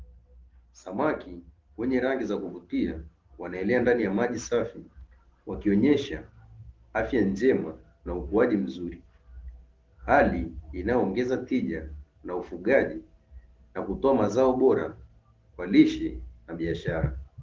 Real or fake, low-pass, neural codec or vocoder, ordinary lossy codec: real; 7.2 kHz; none; Opus, 16 kbps